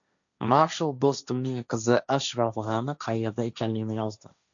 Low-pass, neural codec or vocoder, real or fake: 7.2 kHz; codec, 16 kHz, 1.1 kbps, Voila-Tokenizer; fake